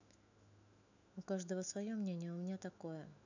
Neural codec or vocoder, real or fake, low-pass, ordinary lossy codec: autoencoder, 48 kHz, 128 numbers a frame, DAC-VAE, trained on Japanese speech; fake; 7.2 kHz; none